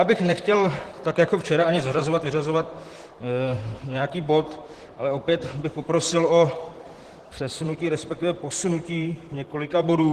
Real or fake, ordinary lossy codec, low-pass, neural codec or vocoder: fake; Opus, 16 kbps; 14.4 kHz; vocoder, 44.1 kHz, 128 mel bands, Pupu-Vocoder